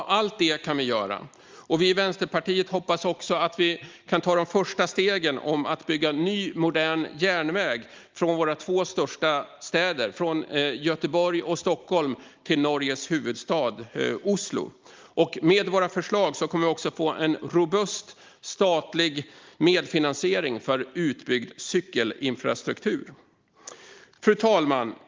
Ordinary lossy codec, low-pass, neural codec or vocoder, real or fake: Opus, 32 kbps; 7.2 kHz; none; real